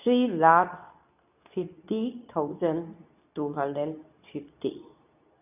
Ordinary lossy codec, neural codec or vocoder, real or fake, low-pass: none; codec, 24 kHz, 0.9 kbps, WavTokenizer, medium speech release version 2; fake; 3.6 kHz